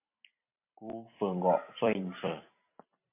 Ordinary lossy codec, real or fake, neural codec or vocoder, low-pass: MP3, 32 kbps; fake; vocoder, 44.1 kHz, 128 mel bands every 256 samples, BigVGAN v2; 3.6 kHz